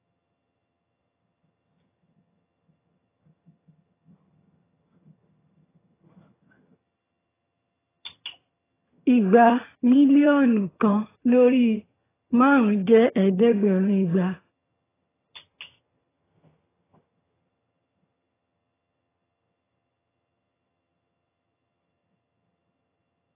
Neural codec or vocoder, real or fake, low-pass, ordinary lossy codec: vocoder, 22.05 kHz, 80 mel bands, HiFi-GAN; fake; 3.6 kHz; AAC, 16 kbps